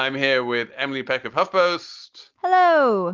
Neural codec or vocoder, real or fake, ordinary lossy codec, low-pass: none; real; Opus, 32 kbps; 7.2 kHz